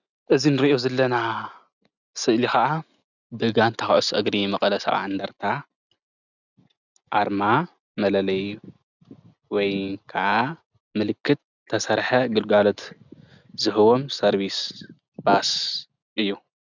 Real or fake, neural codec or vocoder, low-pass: real; none; 7.2 kHz